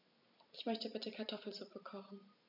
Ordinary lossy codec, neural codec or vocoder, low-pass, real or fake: none; none; 5.4 kHz; real